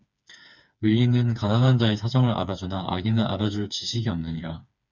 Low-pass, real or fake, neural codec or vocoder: 7.2 kHz; fake; codec, 16 kHz, 4 kbps, FreqCodec, smaller model